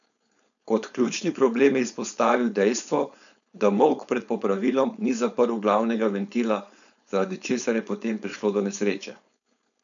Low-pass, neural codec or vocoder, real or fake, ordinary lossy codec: 7.2 kHz; codec, 16 kHz, 4.8 kbps, FACodec; fake; none